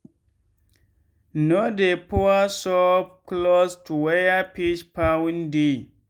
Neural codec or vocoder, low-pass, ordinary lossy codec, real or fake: none; 19.8 kHz; Opus, 32 kbps; real